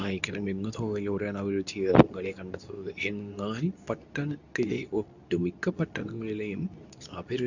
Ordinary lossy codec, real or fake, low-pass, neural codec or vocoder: none; fake; 7.2 kHz; codec, 24 kHz, 0.9 kbps, WavTokenizer, medium speech release version 2